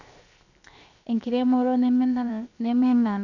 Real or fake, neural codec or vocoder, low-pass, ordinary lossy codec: fake; codec, 16 kHz, 0.7 kbps, FocalCodec; 7.2 kHz; none